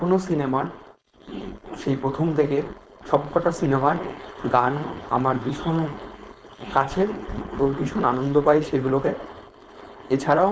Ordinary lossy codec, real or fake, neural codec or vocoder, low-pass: none; fake; codec, 16 kHz, 4.8 kbps, FACodec; none